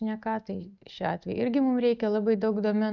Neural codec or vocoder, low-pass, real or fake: none; 7.2 kHz; real